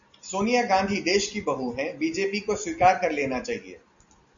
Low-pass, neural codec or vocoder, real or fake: 7.2 kHz; none; real